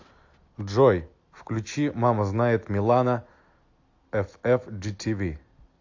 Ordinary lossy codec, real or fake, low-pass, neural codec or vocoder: AAC, 48 kbps; real; 7.2 kHz; none